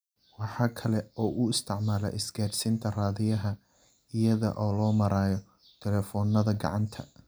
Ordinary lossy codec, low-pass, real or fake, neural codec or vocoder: none; none; real; none